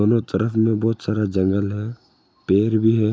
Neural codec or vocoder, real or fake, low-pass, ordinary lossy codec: none; real; none; none